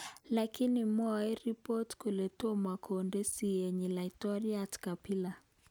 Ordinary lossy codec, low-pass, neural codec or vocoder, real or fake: none; none; none; real